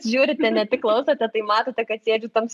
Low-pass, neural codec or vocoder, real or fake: 14.4 kHz; none; real